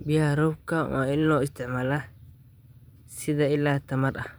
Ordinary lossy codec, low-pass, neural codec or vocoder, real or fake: none; none; vocoder, 44.1 kHz, 128 mel bands every 512 samples, BigVGAN v2; fake